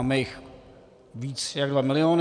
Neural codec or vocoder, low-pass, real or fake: none; 9.9 kHz; real